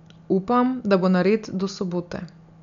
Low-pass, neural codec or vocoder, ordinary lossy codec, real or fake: 7.2 kHz; none; none; real